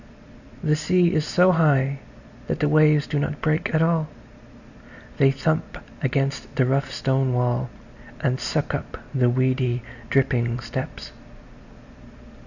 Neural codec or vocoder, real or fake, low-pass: none; real; 7.2 kHz